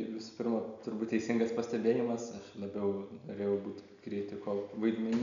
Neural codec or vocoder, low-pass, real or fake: none; 7.2 kHz; real